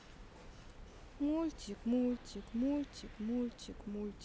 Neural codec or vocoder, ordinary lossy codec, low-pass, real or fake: none; none; none; real